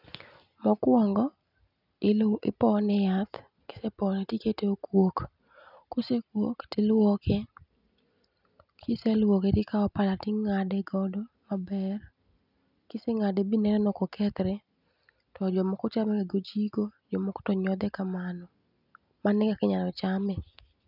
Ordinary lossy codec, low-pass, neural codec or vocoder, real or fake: none; 5.4 kHz; none; real